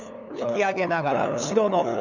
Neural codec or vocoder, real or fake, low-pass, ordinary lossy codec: codec, 16 kHz, 8 kbps, FunCodec, trained on LibriTTS, 25 frames a second; fake; 7.2 kHz; none